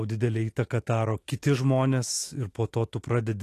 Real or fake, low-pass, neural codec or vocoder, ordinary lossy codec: fake; 14.4 kHz; autoencoder, 48 kHz, 128 numbers a frame, DAC-VAE, trained on Japanese speech; AAC, 48 kbps